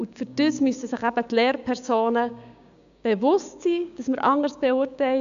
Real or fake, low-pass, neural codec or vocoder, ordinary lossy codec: fake; 7.2 kHz; codec, 16 kHz, 6 kbps, DAC; AAC, 96 kbps